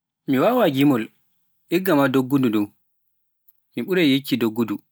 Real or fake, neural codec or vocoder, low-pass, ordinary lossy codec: real; none; none; none